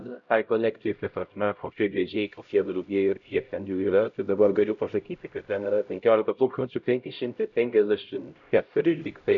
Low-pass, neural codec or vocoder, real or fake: 7.2 kHz; codec, 16 kHz, 0.5 kbps, X-Codec, HuBERT features, trained on LibriSpeech; fake